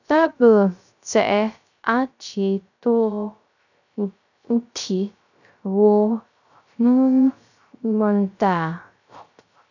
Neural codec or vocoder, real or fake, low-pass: codec, 16 kHz, 0.3 kbps, FocalCodec; fake; 7.2 kHz